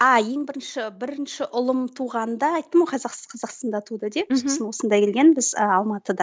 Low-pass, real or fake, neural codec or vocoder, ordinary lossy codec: none; real; none; none